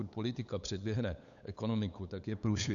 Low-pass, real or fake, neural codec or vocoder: 7.2 kHz; fake; codec, 16 kHz, 8 kbps, FunCodec, trained on LibriTTS, 25 frames a second